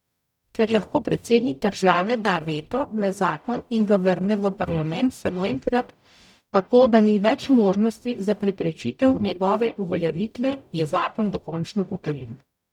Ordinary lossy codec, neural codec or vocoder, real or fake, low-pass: none; codec, 44.1 kHz, 0.9 kbps, DAC; fake; 19.8 kHz